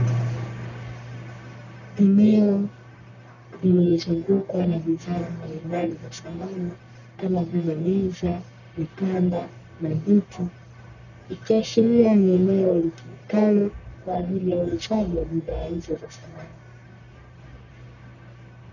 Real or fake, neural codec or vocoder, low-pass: fake; codec, 44.1 kHz, 1.7 kbps, Pupu-Codec; 7.2 kHz